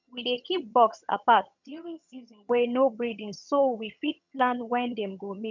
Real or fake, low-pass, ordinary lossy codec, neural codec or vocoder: fake; 7.2 kHz; none; vocoder, 22.05 kHz, 80 mel bands, HiFi-GAN